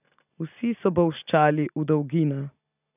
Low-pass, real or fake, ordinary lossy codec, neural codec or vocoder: 3.6 kHz; real; none; none